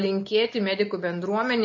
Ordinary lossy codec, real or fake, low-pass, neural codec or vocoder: MP3, 32 kbps; real; 7.2 kHz; none